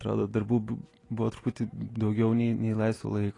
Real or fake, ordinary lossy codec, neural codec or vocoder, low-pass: real; AAC, 32 kbps; none; 10.8 kHz